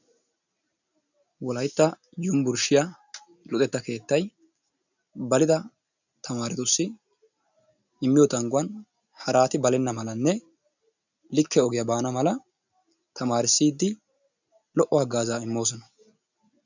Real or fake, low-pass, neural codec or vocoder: real; 7.2 kHz; none